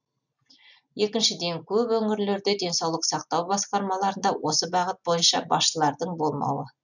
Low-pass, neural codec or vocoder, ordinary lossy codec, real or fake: 7.2 kHz; none; none; real